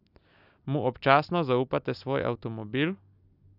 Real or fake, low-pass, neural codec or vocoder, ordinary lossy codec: real; 5.4 kHz; none; none